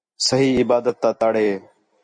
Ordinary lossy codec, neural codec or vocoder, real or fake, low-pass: MP3, 48 kbps; none; real; 9.9 kHz